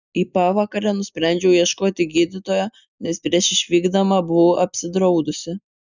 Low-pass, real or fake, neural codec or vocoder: 7.2 kHz; real; none